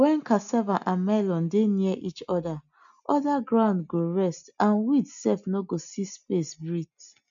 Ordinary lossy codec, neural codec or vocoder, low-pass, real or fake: none; none; 7.2 kHz; real